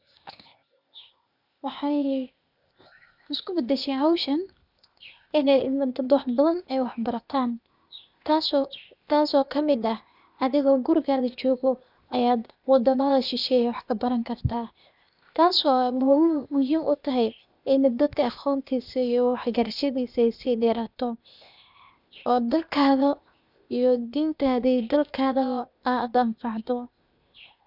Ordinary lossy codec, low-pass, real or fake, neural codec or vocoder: none; 5.4 kHz; fake; codec, 16 kHz, 0.8 kbps, ZipCodec